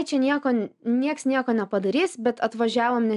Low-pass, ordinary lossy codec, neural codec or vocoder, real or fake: 10.8 kHz; MP3, 96 kbps; none; real